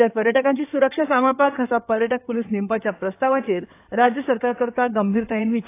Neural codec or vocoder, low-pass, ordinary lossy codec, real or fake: codec, 16 kHz in and 24 kHz out, 2.2 kbps, FireRedTTS-2 codec; 3.6 kHz; AAC, 24 kbps; fake